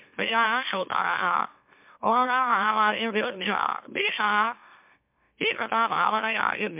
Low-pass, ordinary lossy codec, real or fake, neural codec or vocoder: 3.6 kHz; MP3, 32 kbps; fake; autoencoder, 44.1 kHz, a latent of 192 numbers a frame, MeloTTS